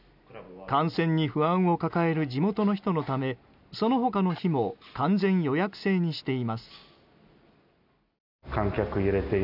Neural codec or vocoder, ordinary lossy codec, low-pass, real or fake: none; none; 5.4 kHz; real